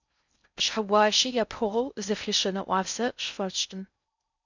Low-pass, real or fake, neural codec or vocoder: 7.2 kHz; fake; codec, 16 kHz in and 24 kHz out, 0.6 kbps, FocalCodec, streaming, 4096 codes